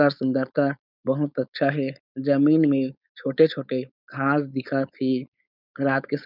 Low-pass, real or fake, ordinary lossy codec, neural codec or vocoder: 5.4 kHz; fake; none; codec, 16 kHz, 4.8 kbps, FACodec